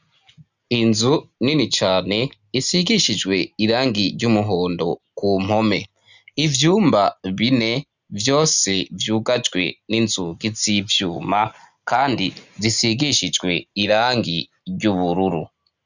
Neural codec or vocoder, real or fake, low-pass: none; real; 7.2 kHz